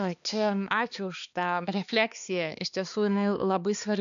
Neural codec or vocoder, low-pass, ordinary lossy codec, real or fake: codec, 16 kHz, 2 kbps, X-Codec, HuBERT features, trained on balanced general audio; 7.2 kHz; MP3, 96 kbps; fake